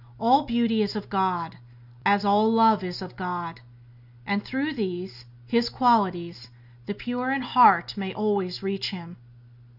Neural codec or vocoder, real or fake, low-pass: none; real; 5.4 kHz